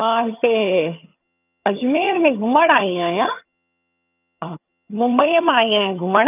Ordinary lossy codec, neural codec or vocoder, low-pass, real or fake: none; vocoder, 22.05 kHz, 80 mel bands, HiFi-GAN; 3.6 kHz; fake